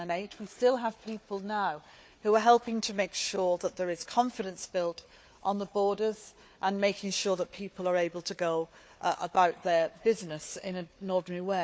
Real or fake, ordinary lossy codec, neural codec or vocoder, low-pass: fake; none; codec, 16 kHz, 4 kbps, FunCodec, trained on Chinese and English, 50 frames a second; none